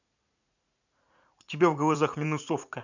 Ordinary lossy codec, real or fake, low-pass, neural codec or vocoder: none; fake; 7.2 kHz; vocoder, 44.1 kHz, 80 mel bands, Vocos